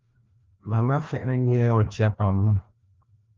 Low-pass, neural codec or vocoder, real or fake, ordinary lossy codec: 7.2 kHz; codec, 16 kHz, 1 kbps, FreqCodec, larger model; fake; Opus, 32 kbps